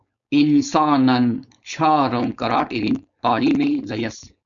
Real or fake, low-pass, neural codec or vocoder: fake; 7.2 kHz; codec, 16 kHz, 4.8 kbps, FACodec